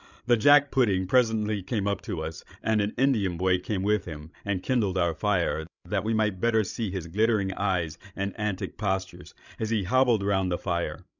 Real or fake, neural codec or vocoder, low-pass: fake; codec, 16 kHz, 16 kbps, FreqCodec, larger model; 7.2 kHz